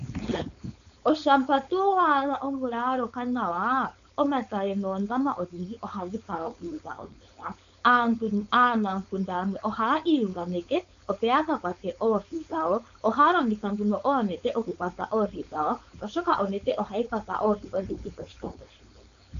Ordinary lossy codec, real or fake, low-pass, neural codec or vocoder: AAC, 96 kbps; fake; 7.2 kHz; codec, 16 kHz, 4.8 kbps, FACodec